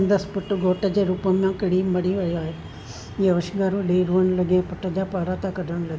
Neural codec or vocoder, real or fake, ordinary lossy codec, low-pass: none; real; none; none